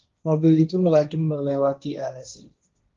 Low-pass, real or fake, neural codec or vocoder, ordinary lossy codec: 7.2 kHz; fake; codec, 16 kHz, 1.1 kbps, Voila-Tokenizer; Opus, 32 kbps